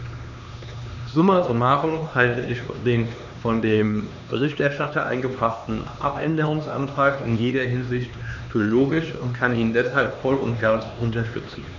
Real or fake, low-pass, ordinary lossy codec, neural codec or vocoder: fake; 7.2 kHz; none; codec, 16 kHz, 2 kbps, X-Codec, HuBERT features, trained on LibriSpeech